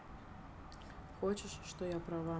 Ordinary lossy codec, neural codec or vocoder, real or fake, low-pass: none; none; real; none